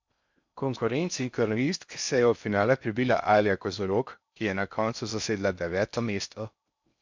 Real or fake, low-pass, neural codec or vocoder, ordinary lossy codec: fake; 7.2 kHz; codec, 16 kHz in and 24 kHz out, 0.6 kbps, FocalCodec, streaming, 4096 codes; MP3, 64 kbps